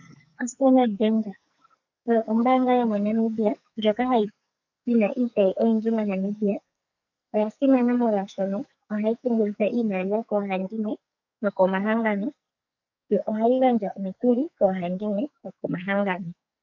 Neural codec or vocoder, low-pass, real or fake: codec, 44.1 kHz, 2.6 kbps, SNAC; 7.2 kHz; fake